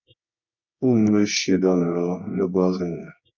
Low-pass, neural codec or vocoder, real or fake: 7.2 kHz; codec, 24 kHz, 0.9 kbps, WavTokenizer, medium music audio release; fake